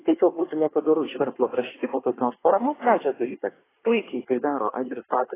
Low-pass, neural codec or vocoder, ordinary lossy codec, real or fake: 3.6 kHz; codec, 24 kHz, 1 kbps, SNAC; AAC, 16 kbps; fake